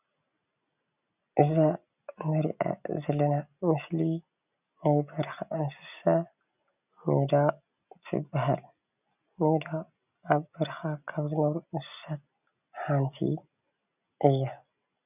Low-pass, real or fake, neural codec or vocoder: 3.6 kHz; real; none